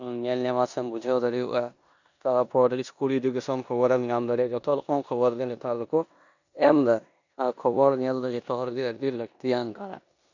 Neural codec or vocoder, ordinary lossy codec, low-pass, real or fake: codec, 16 kHz in and 24 kHz out, 0.9 kbps, LongCat-Audio-Codec, four codebook decoder; none; 7.2 kHz; fake